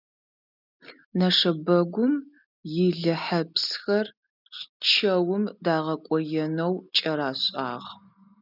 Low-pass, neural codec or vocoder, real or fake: 5.4 kHz; none; real